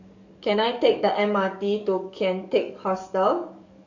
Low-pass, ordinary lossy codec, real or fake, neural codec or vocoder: 7.2 kHz; none; fake; codec, 44.1 kHz, 7.8 kbps, DAC